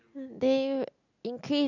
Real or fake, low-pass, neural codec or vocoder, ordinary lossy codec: real; 7.2 kHz; none; none